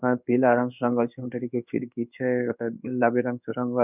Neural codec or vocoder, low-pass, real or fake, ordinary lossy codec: codec, 16 kHz in and 24 kHz out, 1 kbps, XY-Tokenizer; 3.6 kHz; fake; none